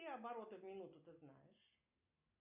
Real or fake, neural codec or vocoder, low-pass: real; none; 3.6 kHz